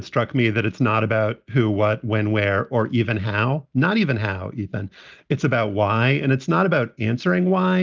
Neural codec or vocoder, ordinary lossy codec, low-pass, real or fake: none; Opus, 24 kbps; 7.2 kHz; real